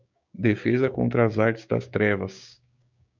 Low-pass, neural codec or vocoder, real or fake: 7.2 kHz; codec, 16 kHz, 6 kbps, DAC; fake